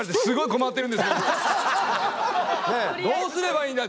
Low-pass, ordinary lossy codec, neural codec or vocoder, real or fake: none; none; none; real